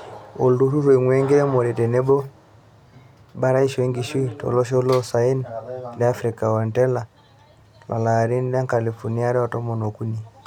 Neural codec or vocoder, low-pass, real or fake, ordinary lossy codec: none; 19.8 kHz; real; none